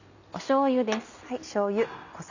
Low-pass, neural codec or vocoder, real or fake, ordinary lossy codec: 7.2 kHz; none; real; none